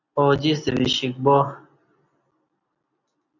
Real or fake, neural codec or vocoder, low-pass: real; none; 7.2 kHz